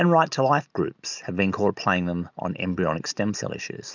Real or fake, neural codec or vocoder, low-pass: real; none; 7.2 kHz